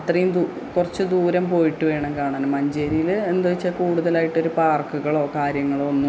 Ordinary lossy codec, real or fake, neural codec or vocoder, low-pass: none; real; none; none